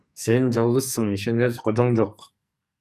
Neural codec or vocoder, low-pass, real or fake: codec, 44.1 kHz, 2.6 kbps, SNAC; 14.4 kHz; fake